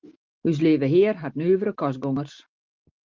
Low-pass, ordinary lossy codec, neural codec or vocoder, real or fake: 7.2 kHz; Opus, 24 kbps; none; real